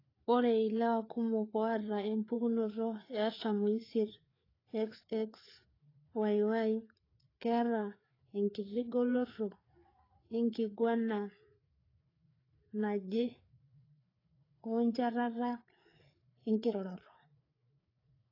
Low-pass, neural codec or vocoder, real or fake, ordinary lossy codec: 5.4 kHz; codec, 16 kHz, 4 kbps, FreqCodec, larger model; fake; AAC, 24 kbps